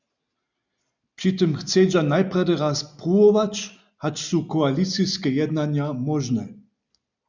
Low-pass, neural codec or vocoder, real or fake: 7.2 kHz; none; real